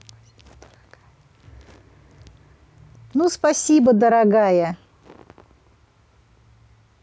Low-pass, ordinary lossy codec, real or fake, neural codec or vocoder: none; none; real; none